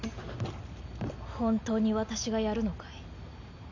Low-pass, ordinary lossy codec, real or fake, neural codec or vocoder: 7.2 kHz; none; real; none